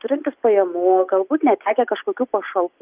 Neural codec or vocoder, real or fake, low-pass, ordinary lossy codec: none; real; 3.6 kHz; Opus, 32 kbps